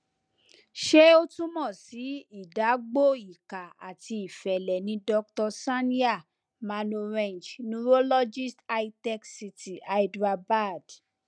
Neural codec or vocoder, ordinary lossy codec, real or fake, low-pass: none; none; real; 9.9 kHz